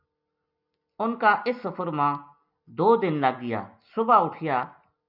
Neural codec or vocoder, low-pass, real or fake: none; 5.4 kHz; real